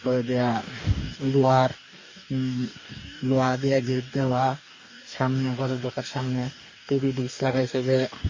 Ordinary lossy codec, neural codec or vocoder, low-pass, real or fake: MP3, 32 kbps; codec, 44.1 kHz, 2.6 kbps, DAC; 7.2 kHz; fake